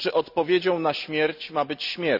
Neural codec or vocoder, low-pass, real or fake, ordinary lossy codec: none; 5.4 kHz; real; none